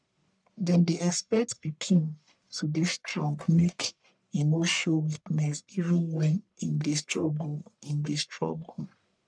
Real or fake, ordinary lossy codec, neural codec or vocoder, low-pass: fake; none; codec, 44.1 kHz, 1.7 kbps, Pupu-Codec; 9.9 kHz